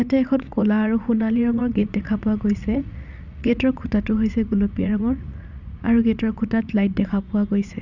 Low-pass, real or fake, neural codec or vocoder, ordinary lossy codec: 7.2 kHz; fake; vocoder, 44.1 kHz, 80 mel bands, Vocos; none